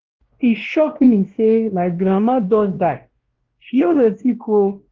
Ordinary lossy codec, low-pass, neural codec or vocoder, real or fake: Opus, 16 kbps; 7.2 kHz; codec, 16 kHz, 1 kbps, X-Codec, WavLM features, trained on Multilingual LibriSpeech; fake